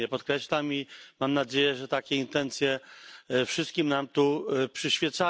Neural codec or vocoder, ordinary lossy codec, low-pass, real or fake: none; none; none; real